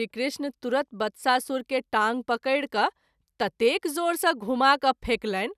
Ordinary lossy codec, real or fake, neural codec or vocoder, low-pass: none; real; none; 14.4 kHz